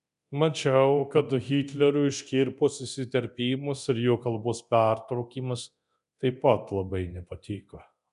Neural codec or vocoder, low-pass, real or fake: codec, 24 kHz, 0.9 kbps, DualCodec; 10.8 kHz; fake